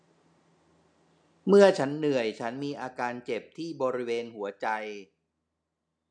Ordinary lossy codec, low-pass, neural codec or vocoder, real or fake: none; 9.9 kHz; none; real